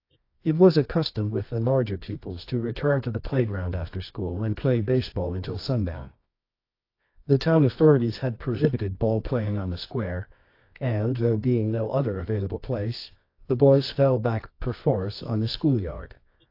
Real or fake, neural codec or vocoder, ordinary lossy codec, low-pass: fake; codec, 24 kHz, 0.9 kbps, WavTokenizer, medium music audio release; AAC, 32 kbps; 5.4 kHz